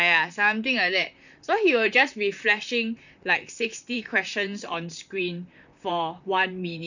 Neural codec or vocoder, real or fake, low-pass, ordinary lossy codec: vocoder, 44.1 kHz, 128 mel bands, Pupu-Vocoder; fake; 7.2 kHz; none